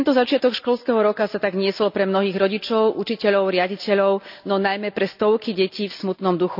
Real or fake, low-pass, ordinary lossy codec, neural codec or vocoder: real; 5.4 kHz; none; none